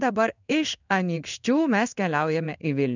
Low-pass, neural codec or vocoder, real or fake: 7.2 kHz; codec, 16 kHz in and 24 kHz out, 1 kbps, XY-Tokenizer; fake